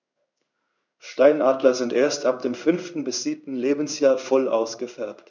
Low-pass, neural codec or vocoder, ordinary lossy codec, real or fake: 7.2 kHz; codec, 16 kHz in and 24 kHz out, 1 kbps, XY-Tokenizer; none; fake